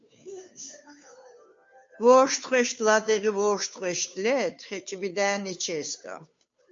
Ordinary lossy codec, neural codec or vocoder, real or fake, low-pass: MP3, 48 kbps; codec, 16 kHz, 2 kbps, FunCodec, trained on Chinese and English, 25 frames a second; fake; 7.2 kHz